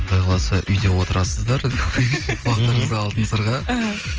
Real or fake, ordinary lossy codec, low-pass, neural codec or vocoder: real; Opus, 24 kbps; 7.2 kHz; none